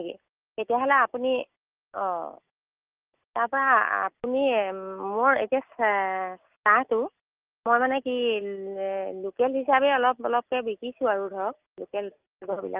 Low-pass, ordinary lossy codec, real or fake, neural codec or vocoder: 3.6 kHz; Opus, 32 kbps; real; none